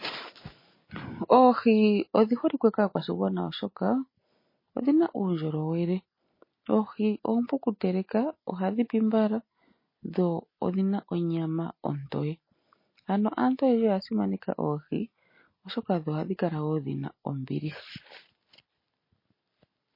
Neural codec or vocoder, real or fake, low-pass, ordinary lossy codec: none; real; 5.4 kHz; MP3, 24 kbps